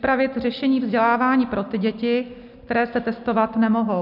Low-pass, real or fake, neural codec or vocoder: 5.4 kHz; real; none